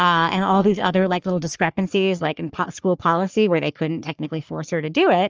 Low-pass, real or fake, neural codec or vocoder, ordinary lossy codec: 7.2 kHz; fake; codec, 44.1 kHz, 3.4 kbps, Pupu-Codec; Opus, 24 kbps